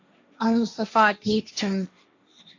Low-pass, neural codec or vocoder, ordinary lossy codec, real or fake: 7.2 kHz; codec, 16 kHz, 1.1 kbps, Voila-Tokenizer; AAC, 48 kbps; fake